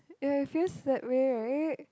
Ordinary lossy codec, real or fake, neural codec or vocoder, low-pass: none; real; none; none